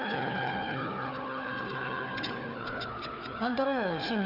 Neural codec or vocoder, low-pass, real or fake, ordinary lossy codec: codec, 16 kHz, 4 kbps, FunCodec, trained on Chinese and English, 50 frames a second; 5.4 kHz; fake; none